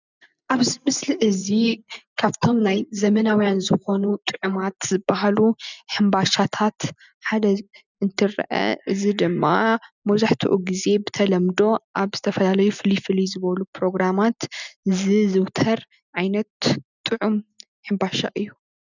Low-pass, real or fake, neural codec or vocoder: 7.2 kHz; real; none